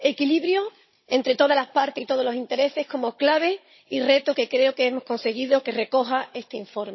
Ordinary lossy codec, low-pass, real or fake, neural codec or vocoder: MP3, 24 kbps; 7.2 kHz; fake; codec, 16 kHz, 16 kbps, FunCodec, trained on Chinese and English, 50 frames a second